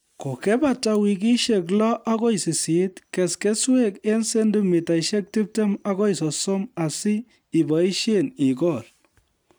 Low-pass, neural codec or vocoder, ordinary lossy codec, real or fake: none; none; none; real